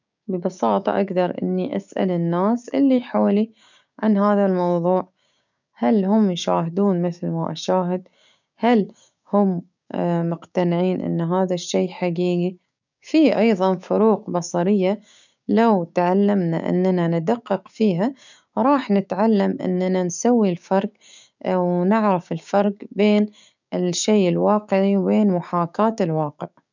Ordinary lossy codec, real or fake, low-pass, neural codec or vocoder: none; fake; 7.2 kHz; codec, 16 kHz, 6 kbps, DAC